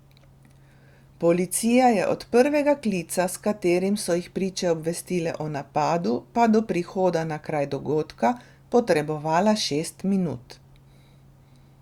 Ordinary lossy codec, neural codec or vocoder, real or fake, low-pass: Opus, 64 kbps; none; real; 19.8 kHz